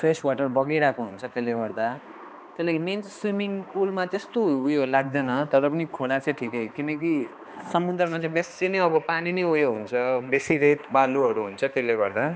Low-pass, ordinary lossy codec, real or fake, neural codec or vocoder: none; none; fake; codec, 16 kHz, 2 kbps, X-Codec, HuBERT features, trained on balanced general audio